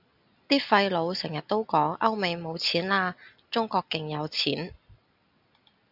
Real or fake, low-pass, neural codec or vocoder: real; 5.4 kHz; none